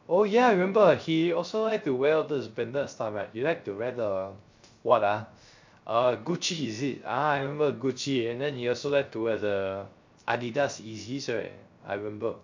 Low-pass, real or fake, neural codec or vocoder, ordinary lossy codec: 7.2 kHz; fake; codec, 16 kHz, 0.3 kbps, FocalCodec; MP3, 64 kbps